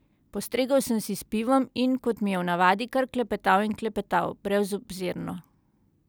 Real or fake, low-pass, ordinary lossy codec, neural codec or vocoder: real; none; none; none